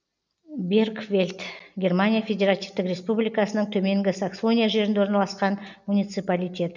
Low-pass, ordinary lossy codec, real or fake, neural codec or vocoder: 7.2 kHz; none; real; none